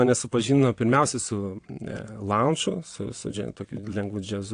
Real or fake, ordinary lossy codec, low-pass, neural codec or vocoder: fake; AAC, 48 kbps; 9.9 kHz; vocoder, 22.05 kHz, 80 mel bands, WaveNeXt